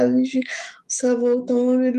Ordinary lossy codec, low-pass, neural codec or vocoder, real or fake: Opus, 32 kbps; 14.4 kHz; autoencoder, 48 kHz, 128 numbers a frame, DAC-VAE, trained on Japanese speech; fake